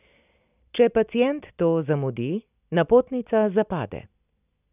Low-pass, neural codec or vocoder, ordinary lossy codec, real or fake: 3.6 kHz; none; none; real